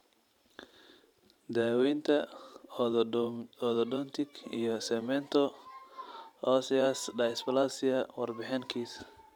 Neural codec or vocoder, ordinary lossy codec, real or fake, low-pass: vocoder, 44.1 kHz, 128 mel bands every 512 samples, BigVGAN v2; none; fake; 19.8 kHz